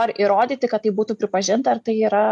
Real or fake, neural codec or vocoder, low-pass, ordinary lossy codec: real; none; 10.8 kHz; MP3, 96 kbps